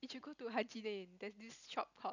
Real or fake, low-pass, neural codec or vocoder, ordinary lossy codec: real; 7.2 kHz; none; none